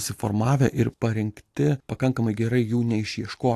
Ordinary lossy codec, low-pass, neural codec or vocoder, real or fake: AAC, 64 kbps; 14.4 kHz; none; real